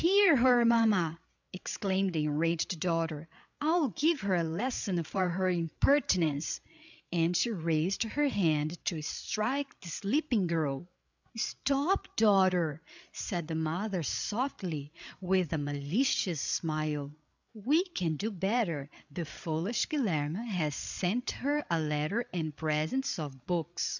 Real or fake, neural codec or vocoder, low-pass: fake; codec, 16 kHz, 8 kbps, FreqCodec, larger model; 7.2 kHz